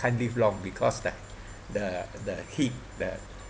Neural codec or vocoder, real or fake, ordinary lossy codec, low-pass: none; real; none; none